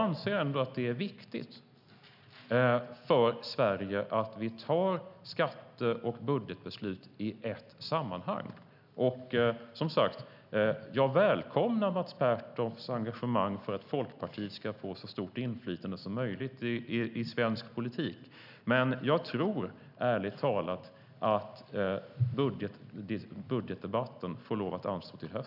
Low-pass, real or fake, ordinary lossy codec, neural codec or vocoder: 5.4 kHz; real; none; none